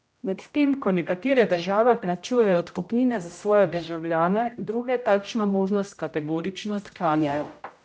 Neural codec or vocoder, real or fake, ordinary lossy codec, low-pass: codec, 16 kHz, 0.5 kbps, X-Codec, HuBERT features, trained on general audio; fake; none; none